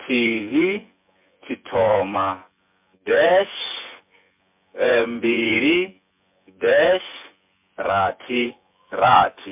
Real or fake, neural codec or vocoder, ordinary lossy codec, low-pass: fake; vocoder, 24 kHz, 100 mel bands, Vocos; MP3, 32 kbps; 3.6 kHz